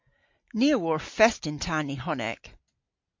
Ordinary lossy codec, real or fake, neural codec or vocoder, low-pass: MP3, 48 kbps; real; none; 7.2 kHz